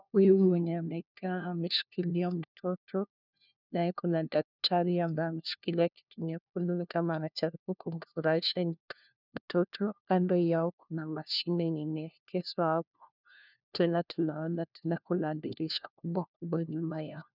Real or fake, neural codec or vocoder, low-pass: fake; codec, 16 kHz, 1 kbps, FunCodec, trained on LibriTTS, 50 frames a second; 5.4 kHz